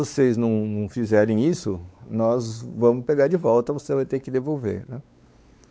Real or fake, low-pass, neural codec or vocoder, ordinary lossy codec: fake; none; codec, 16 kHz, 4 kbps, X-Codec, WavLM features, trained on Multilingual LibriSpeech; none